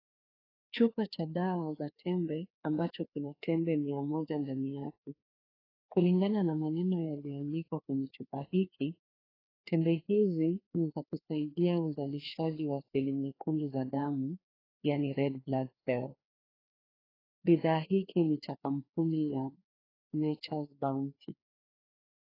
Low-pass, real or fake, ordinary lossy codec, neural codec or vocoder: 5.4 kHz; fake; AAC, 24 kbps; codec, 16 kHz, 2 kbps, FreqCodec, larger model